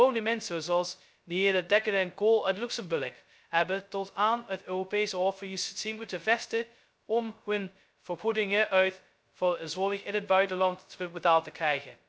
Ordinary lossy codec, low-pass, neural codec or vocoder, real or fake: none; none; codec, 16 kHz, 0.2 kbps, FocalCodec; fake